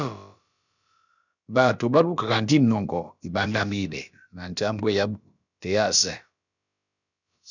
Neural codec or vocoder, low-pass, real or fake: codec, 16 kHz, about 1 kbps, DyCAST, with the encoder's durations; 7.2 kHz; fake